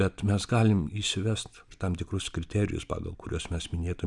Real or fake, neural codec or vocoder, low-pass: real; none; 10.8 kHz